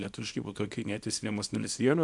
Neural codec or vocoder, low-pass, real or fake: codec, 24 kHz, 0.9 kbps, WavTokenizer, small release; 10.8 kHz; fake